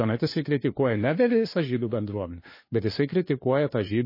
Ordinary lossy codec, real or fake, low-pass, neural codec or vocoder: MP3, 24 kbps; fake; 5.4 kHz; codec, 16 kHz, 2 kbps, FunCodec, trained on LibriTTS, 25 frames a second